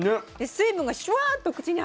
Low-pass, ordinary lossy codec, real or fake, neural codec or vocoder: none; none; real; none